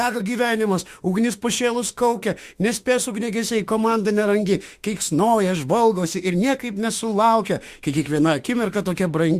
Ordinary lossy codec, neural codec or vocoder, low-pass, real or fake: Opus, 64 kbps; autoencoder, 48 kHz, 32 numbers a frame, DAC-VAE, trained on Japanese speech; 14.4 kHz; fake